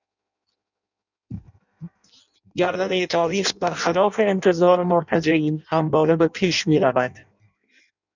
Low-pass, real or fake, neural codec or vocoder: 7.2 kHz; fake; codec, 16 kHz in and 24 kHz out, 0.6 kbps, FireRedTTS-2 codec